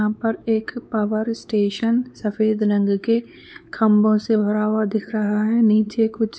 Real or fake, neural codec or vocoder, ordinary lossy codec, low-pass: fake; codec, 16 kHz, 4 kbps, X-Codec, WavLM features, trained on Multilingual LibriSpeech; none; none